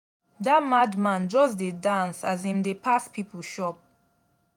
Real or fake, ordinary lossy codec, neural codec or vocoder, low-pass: fake; none; vocoder, 48 kHz, 128 mel bands, Vocos; 19.8 kHz